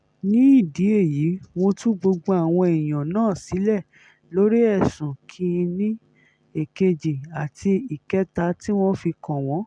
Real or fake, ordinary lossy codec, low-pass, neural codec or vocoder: real; none; 9.9 kHz; none